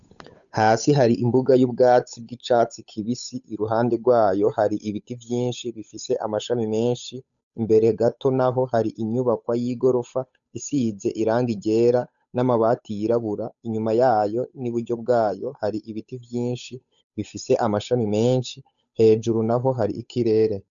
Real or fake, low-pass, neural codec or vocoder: fake; 7.2 kHz; codec, 16 kHz, 8 kbps, FunCodec, trained on Chinese and English, 25 frames a second